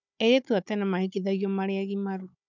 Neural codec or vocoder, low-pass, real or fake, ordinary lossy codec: codec, 16 kHz, 16 kbps, FunCodec, trained on Chinese and English, 50 frames a second; 7.2 kHz; fake; none